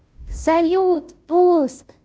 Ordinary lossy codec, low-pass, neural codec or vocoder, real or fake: none; none; codec, 16 kHz, 0.5 kbps, FunCodec, trained on Chinese and English, 25 frames a second; fake